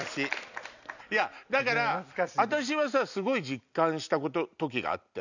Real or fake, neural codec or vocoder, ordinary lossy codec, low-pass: real; none; none; 7.2 kHz